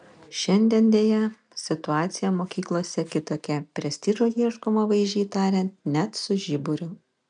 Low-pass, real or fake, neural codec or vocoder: 9.9 kHz; real; none